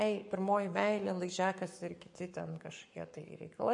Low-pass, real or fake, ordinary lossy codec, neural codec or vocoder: 10.8 kHz; fake; MP3, 48 kbps; codec, 44.1 kHz, 7.8 kbps, Pupu-Codec